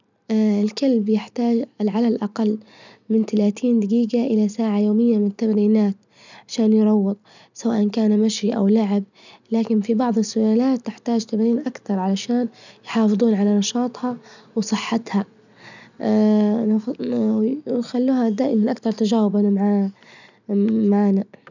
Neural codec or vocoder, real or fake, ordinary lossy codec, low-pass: none; real; none; 7.2 kHz